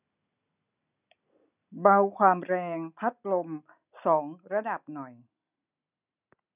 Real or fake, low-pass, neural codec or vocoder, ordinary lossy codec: real; 3.6 kHz; none; none